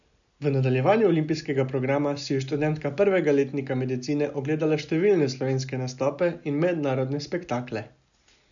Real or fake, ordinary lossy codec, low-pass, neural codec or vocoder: real; none; 7.2 kHz; none